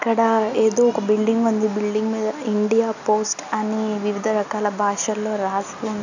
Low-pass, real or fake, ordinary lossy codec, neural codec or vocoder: 7.2 kHz; real; none; none